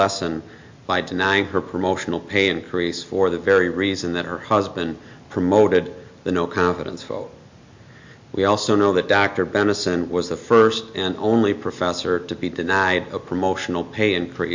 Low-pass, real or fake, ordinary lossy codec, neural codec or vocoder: 7.2 kHz; real; MP3, 48 kbps; none